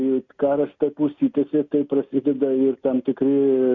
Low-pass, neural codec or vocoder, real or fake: 7.2 kHz; none; real